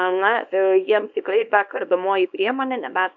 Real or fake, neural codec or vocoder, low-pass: fake; codec, 24 kHz, 0.9 kbps, WavTokenizer, small release; 7.2 kHz